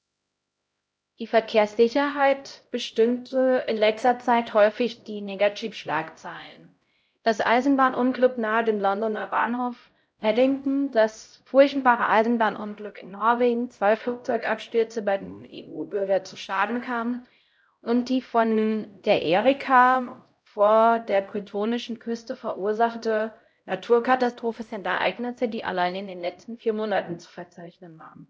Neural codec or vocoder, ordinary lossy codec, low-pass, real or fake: codec, 16 kHz, 0.5 kbps, X-Codec, HuBERT features, trained on LibriSpeech; none; none; fake